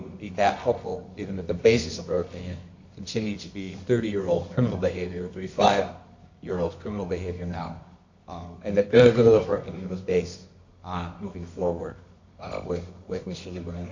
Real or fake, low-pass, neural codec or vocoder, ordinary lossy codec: fake; 7.2 kHz; codec, 24 kHz, 0.9 kbps, WavTokenizer, medium music audio release; MP3, 64 kbps